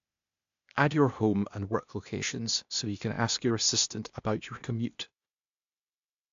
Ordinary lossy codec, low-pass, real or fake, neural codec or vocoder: AAC, 64 kbps; 7.2 kHz; fake; codec, 16 kHz, 0.8 kbps, ZipCodec